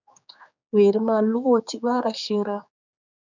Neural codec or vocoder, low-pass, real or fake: codec, 16 kHz, 4 kbps, X-Codec, HuBERT features, trained on general audio; 7.2 kHz; fake